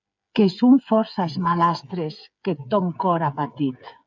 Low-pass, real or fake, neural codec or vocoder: 7.2 kHz; fake; codec, 16 kHz, 8 kbps, FreqCodec, smaller model